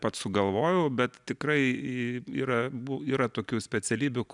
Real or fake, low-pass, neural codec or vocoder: real; 10.8 kHz; none